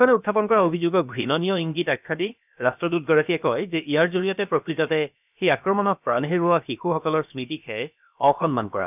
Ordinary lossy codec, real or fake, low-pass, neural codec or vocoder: none; fake; 3.6 kHz; codec, 16 kHz, about 1 kbps, DyCAST, with the encoder's durations